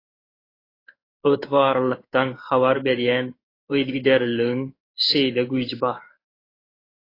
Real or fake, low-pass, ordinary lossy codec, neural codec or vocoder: fake; 5.4 kHz; AAC, 24 kbps; codec, 16 kHz in and 24 kHz out, 1 kbps, XY-Tokenizer